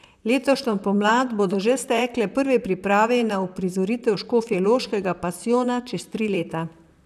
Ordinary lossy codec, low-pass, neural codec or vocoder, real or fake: none; 14.4 kHz; vocoder, 44.1 kHz, 128 mel bands, Pupu-Vocoder; fake